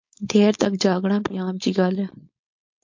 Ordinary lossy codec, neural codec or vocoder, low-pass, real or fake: MP3, 48 kbps; codec, 16 kHz, 4.8 kbps, FACodec; 7.2 kHz; fake